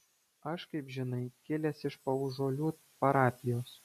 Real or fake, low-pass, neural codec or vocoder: real; 14.4 kHz; none